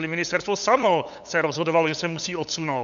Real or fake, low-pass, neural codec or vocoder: fake; 7.2 kHz; codec, 16 kHz, 8 kbps, FunCodec, trained on LibriTTS, 25 frames a second